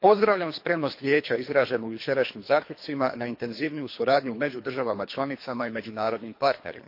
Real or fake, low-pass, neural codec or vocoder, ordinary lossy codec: fake; 5.4 kHz; codec, 24 kHz, 3 kbps, HILCodec; MP3, 32 kbps